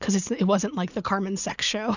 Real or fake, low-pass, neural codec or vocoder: fake; 7.2 kHz; vocoder, 44.1 kHz, 80 mel bands, Vocos